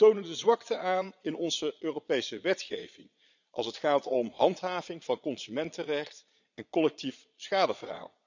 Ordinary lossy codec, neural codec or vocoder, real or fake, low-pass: none; vocoder, 22.05 kHz, 80 mel bands, Vocos; fake; 7.2 kHz